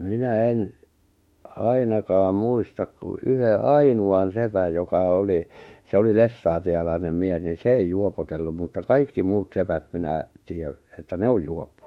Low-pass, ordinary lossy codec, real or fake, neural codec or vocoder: 19.8 kHz; MP3, 64 kbps; fake; autoencoder, 48 kHz, 32 numbers a frame, DAC-VAE, trained on Japanese speech